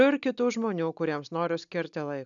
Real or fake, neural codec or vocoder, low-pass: real; none; 7.2 kHz